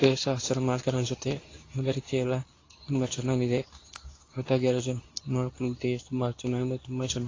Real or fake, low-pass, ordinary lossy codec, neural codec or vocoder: fake; 7.2 kHz; AAC, 32 kbps; codec, 24 kHz, 0.9 kbps, WavTokenizer, medium speech release version 2